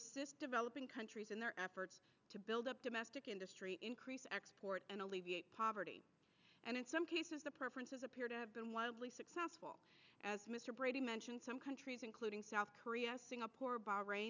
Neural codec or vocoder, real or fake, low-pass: none; real; 7.2 kHz